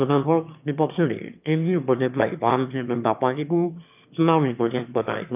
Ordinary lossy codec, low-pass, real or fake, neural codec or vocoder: none; 3.6 kHz; fake; autoencoder, 22.05 kHz, a latent of 192 numbers a frame, VITS, trained on one speaker